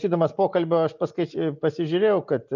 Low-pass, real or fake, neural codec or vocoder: 7.2 kHz; real; none